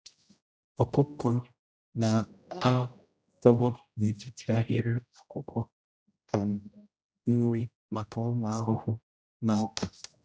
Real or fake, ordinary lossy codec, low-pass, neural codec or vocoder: fake; none; none; codec, 16 kHz, 0.5 kbps, X-Codec, HuBERT features, trained on general audio